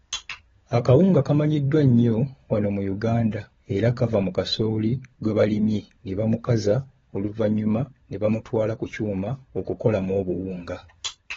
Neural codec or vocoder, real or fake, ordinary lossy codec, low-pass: codec, 16 kHz, 16 kbps, FunCodec, trained on LibriTTS, 50 frames a second; fake; AAC, 24 kbps; 7.2 kHz